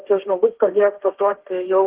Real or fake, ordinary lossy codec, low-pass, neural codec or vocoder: fake; Opus, 16 kbps; 3.6 kHz; codec, 16 kHz, 1.1 kbps, Voila-Tokenizer